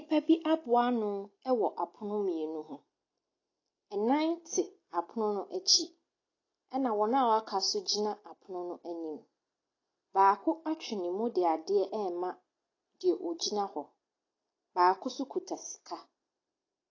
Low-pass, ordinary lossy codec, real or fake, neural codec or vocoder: 7.2 kHz; AAC, 32 kbps; real; none